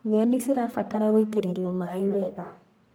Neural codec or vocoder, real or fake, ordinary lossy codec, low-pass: codec, 44.1 kHz, 1.7 kbps, Pupu-Codec; fake; none; none